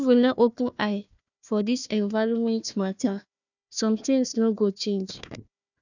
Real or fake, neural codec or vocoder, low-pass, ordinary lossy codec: fake; codec, 16 kHz, 1 kbps, FunCodec, trained on Chinese and English, 50 frames a second; 7.2 kHz; none